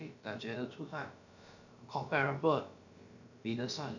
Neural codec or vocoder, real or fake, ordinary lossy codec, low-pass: codec, 16 kHz, about 1 kbps, DyCAST, with the encoder's durations; fake; none; 7.2 kHz